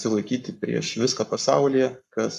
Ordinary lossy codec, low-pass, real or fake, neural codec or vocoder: AAC, 96 kbps; 14.4 kHz; fake; codec, 44.1 kHz, 7.8 kbps, Pupu-Codec